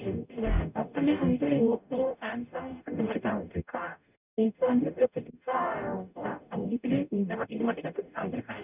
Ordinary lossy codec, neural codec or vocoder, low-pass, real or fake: none; codec, 44.1 kHz, 0.9 kbps, DAC; 3.6 kHz; fake